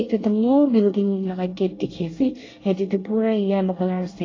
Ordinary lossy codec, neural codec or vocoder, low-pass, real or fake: AAC, 32 kbps; codec, 24 kHz, 1 kbps, SNAC; 7.2 kHz; fake